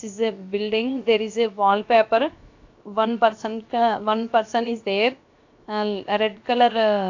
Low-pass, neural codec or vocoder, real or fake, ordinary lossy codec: 7.2 kHz; codec, 16 kHz, 0.7 kbps, FocalCodec; fake; AAC, 48 kbps